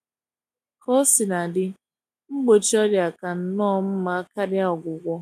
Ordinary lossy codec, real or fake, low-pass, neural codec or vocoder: none; real; 14.4 kHz; none